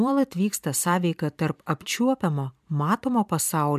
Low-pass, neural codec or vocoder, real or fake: 14.4 kHz; vocoder, 44.1 kHz, 128 mel bands every 256 samples, BigVGAN v2; fake